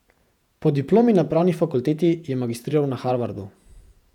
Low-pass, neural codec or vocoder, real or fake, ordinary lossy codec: 19.8 kHz; vocoder, 48 kHz, 128 mel bands, Vocos; fake; none